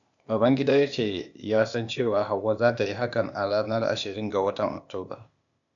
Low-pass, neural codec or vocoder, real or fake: 7.2 kHz; codec, 16 kHz, 0.8 kbps, ZipCodec; fake